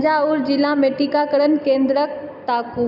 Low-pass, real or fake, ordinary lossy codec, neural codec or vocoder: 5.4 kHz; real; none; none